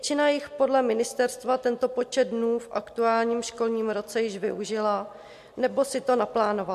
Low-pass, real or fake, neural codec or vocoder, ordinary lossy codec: 14.4 kHz; real; none; MP3, 64 kbps